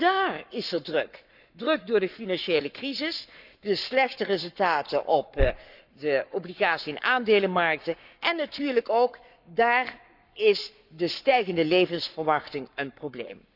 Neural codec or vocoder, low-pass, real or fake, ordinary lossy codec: codec, 44.1 kHz, 7.8 kbps, Pupu-Codec; 5.4 kHz; fake; none